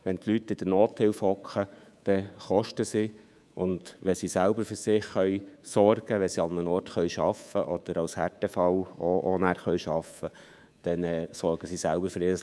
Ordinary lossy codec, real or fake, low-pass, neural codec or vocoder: none; fake; none; codec, 24 kHz, 3.1 kbps, DualCodec